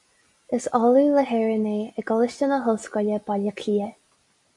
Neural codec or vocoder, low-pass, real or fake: none; 10.8 kHz; real